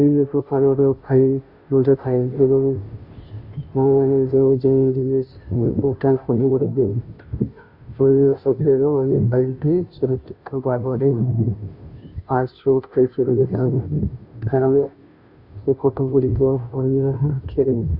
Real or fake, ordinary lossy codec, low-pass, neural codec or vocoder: fake; Opus, 64 kbps; 5.4 kHz; codec, 16 kHz, 0.5 kbps, FunCodec, trained on Chinese and English, 25 frames a second